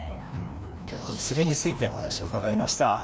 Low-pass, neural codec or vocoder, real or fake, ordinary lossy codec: none; codec, 16 kHz, 1 kbps, FreqCodec, larger model; fake; none